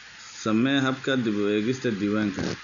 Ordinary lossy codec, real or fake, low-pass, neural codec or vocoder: none; real; 7.2 kHz; none